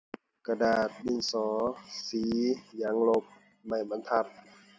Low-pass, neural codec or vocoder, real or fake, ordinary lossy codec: none; none; real; none